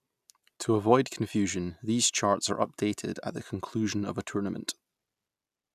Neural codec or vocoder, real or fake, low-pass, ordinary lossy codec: none; real; 14.4 kHz; none